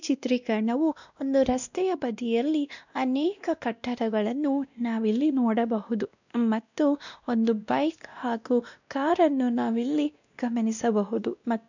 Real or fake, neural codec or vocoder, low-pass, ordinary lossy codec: fake; codec, 16 kHz, 1 kbps, X-Codec, WavLM features, trained on Multilingual LibriSpeech; 7.2 kHz; none